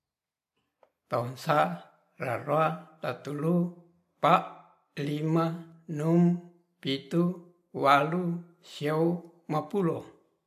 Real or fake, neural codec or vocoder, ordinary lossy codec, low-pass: real; none; MP3, 64 kbps; 14.4 kHz